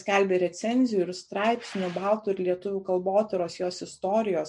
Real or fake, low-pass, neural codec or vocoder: real; 10.8 kHz; none